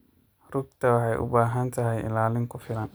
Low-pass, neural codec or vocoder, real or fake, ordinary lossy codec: none; none; real; none